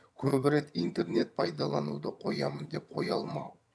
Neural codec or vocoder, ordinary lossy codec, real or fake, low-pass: vocoder, 22.05 kHz, 80 mel bands, HiFi-GAN; none; fake; none